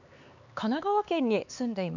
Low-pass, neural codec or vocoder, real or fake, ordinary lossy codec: 7.2 kHz; codec, 16 kHz, 4 kbps, X-Codec, HuBERT features, trained on LibriSpeech; fake; none